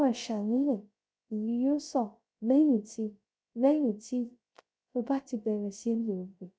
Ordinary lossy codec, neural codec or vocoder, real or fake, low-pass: none; codec, 16 kHz, 0.3 kbps, FocalCodec; fake; none